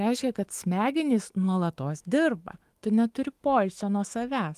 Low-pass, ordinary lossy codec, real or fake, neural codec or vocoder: 14.4 kHz; Opus, 24 kbps; fake; autoencoder, 48 kHz, 128 numbers a frame, DAC-VAE, trained on Japanese speech